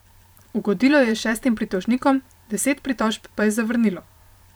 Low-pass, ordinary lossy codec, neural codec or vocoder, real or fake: none; none; vocoder, 44.1 kHz, 128 mel bands every 512 samples, BigVGAN v2; fake